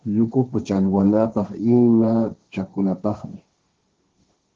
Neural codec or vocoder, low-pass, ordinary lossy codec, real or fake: codec, 16 kHz, 1.1 kbps, Voila-Tokenizer; 7.2 kHz; Opus, 16 kbps; fake